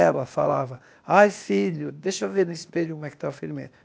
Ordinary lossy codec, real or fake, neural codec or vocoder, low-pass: none; fake; codec, 16 kHz, 0.8 kbps, ZipCodec; none